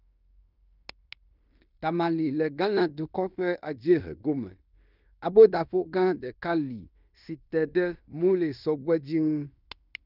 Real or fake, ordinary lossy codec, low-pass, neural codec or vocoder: fake; none; 5.4 kHz; codec, 16 kHz in and 24 kHz out, 0.9 kbps, LongCat-Audio-Codec, fine tuned four codebook decoder